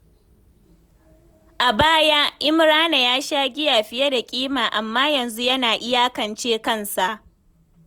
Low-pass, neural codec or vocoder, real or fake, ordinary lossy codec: 19.8 kHz; none; real; none